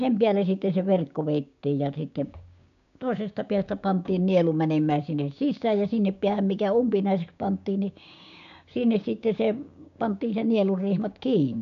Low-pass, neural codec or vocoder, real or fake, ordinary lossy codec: 7.2 kHz; codec, 16 kHz, 6 kbps, DAC; fake; MP3, 96 kbps